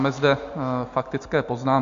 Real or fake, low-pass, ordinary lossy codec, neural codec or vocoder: real; 7.2 kHz; AAC, 64 kbps; none